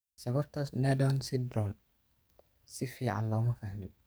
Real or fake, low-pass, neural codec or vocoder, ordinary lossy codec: fake; none; codec, 44.1 kHz, 2.6 kbps, SNAC; none